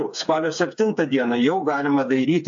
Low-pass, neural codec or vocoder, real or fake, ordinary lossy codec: 7.2 kHz; codec, 16 kHz, 4 kbps, FreqCodec, smaller model; fake; AAC, 64 kbps